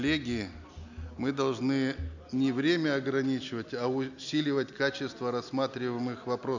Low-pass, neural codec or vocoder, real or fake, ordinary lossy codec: 7.2 kHz; none; real; none